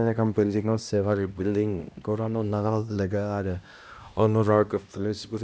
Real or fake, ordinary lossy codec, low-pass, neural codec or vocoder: fake; none; none; codec, 16 kHz, 1 kbps, X-Codec, HuBERT features, trained on LibriSpeech